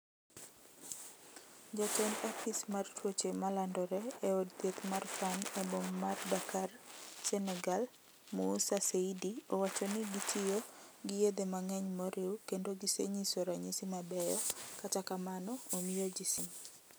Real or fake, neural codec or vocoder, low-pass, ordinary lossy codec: real; none; none; none